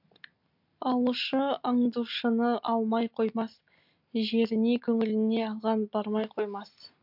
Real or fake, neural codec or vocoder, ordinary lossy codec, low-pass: real; none; MP3, 48 kbps; 5.4 kHz